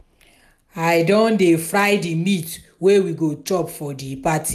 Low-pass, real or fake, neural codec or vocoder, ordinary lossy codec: 14.4 kHz; real; none; none